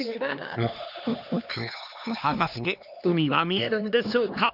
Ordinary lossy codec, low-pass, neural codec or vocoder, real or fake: none; 5.4 kHz; codec, 16 kHz, 2 kbps, X-Codec, HuBERT features, trained on LibriSpeech; fake